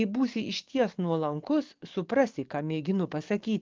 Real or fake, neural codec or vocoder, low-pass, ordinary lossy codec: fake; codec, 44.1 kHz, 7.8 kbps, DAC; 7.2 kHz; Opus, 32 kbps